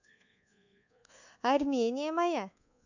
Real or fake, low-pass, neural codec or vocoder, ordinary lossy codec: fake; 7.2 kHz; codec, 24 kHz, 1.2 kbps, DualCodec; none